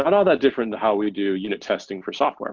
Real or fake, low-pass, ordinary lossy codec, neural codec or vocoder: real; 7.2 kHz; Opus, 24 kbps; none